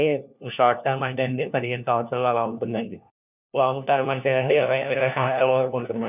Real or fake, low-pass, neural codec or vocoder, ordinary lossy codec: fake; 3.6 kHz; codec, 16 kHz, 1 kbps, FunCodec, trained on LibriTTS, 50 frames a second; none